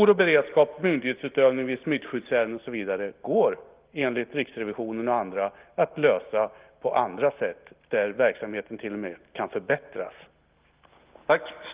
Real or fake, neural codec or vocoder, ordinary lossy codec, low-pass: real; none; Opus, 32 kbps; 3.6 kHz